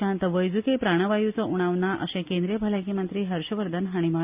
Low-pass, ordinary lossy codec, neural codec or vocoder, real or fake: 3.6 kHz; Opus, 64 kbps; none; real